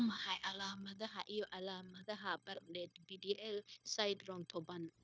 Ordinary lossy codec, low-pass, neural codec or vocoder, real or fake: none; none; codec, 16 kHz, 0.9 kbps, LongCat-Audio-Codec; fake